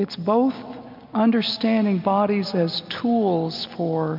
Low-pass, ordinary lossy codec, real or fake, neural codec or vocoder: 5.4 kHz; MP3, 48 kbps; real; none